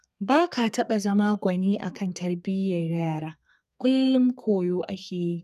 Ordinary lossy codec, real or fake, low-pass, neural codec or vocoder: none; fake; 14.4 kHz; codec, 32 kHz, 1.9 kbps, SNAC